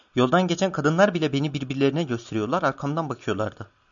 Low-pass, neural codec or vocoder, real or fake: 7.2 kHz; none; real